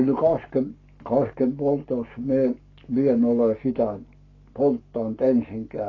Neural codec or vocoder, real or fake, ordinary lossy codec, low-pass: codec, 44.1 kHz, 7.8 kbps, Pupu-Codec; fake; AAC, 32 kbps; 7.2 kHz